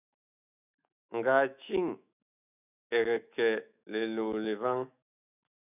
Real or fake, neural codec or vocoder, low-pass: fake; autoencoder, 48 kHz, 128 numbers a frame, DAC-VAE, trained on Japanese speech; 3.6 kHz